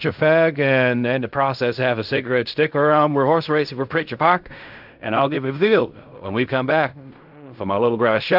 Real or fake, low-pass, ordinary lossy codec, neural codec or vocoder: fake; 5.4 kHz; AAC, 48 kbps; codec, 16 kHz in and 24 kHz out, 0.4 kbps, LongCat-Audio-Codec, fine tuned four codebook decoder